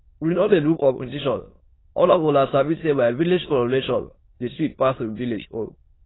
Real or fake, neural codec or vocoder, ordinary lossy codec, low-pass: fake; autoencoder, 22.05 kHz, a latent of 192 numbers a frame, VITS, trained on many speakers; AAC, 16 kbps; 7.2 kHz